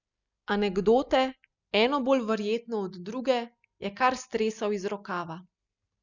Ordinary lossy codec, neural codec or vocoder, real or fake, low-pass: none; none; real; 7.2 kHz